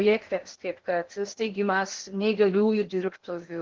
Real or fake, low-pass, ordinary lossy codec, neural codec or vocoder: fake; 7.2 kHz; Opus, 16 kbps; codec, 16 kHz in and 24 kHz out, 0.8 kbps, FocalCodec, streaming, 65536 codes